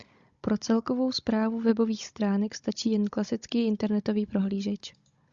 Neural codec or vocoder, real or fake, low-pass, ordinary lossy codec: codec, 16 kHz, 16 kbps, FunCodec, trained on Chinese and English, 50 frames a second; fake; 7.2 kHz; Opus, 64 kbps